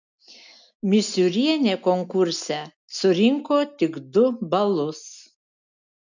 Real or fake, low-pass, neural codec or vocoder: real; 7.2 kHz; none